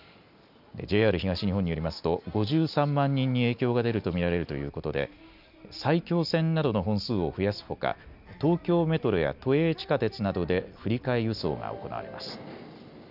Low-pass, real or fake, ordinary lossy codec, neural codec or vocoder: 5.4 kHz; real; none; none